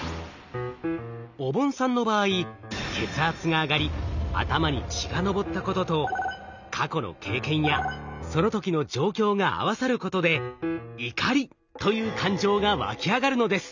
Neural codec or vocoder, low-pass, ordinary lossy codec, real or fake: none; 7.2 kHz; none; real